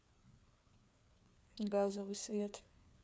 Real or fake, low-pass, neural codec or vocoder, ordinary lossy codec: fake; none; codec, 16 kHz, 4 kbps, FreqCodec, larger model; none